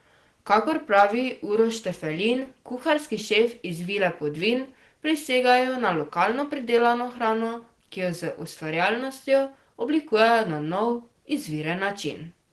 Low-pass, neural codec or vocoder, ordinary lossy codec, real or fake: 10.8 kHz; none; Opus, 16 kbps; real